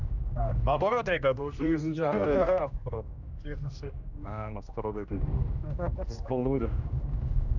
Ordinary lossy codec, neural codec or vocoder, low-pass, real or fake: none; codec, 16 kHz, 1 kbps, X-Codec, HuBERT features, trained on general audio; 7.2 kHz; fake